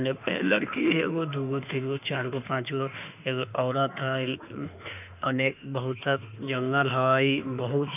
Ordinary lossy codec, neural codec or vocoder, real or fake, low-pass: none; autoencoder, 48 kHz, 32 numbers a frame, DAC-VAE, trained on Japanese speech; fake; 3.6 kHz